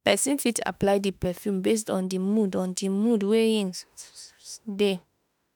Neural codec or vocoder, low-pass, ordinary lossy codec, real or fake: autoencoder, 48 kHz, 32 numbers a frame, DAC-VAE, trained on Japanese speech; none; none; fake